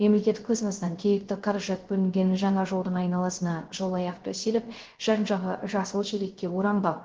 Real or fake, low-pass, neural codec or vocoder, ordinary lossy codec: fake; 7.2 kHz; codec, 16 kHz, 0.3 kbps, FocalCodec; Opus, 16 kbps